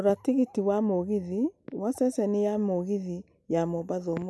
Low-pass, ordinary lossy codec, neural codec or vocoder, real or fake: none; none; none; real